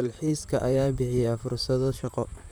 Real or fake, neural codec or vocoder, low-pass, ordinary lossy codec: fake; vocoder, 44.1 kHz, 128 mel bands, Pupu-Vocoder; none; none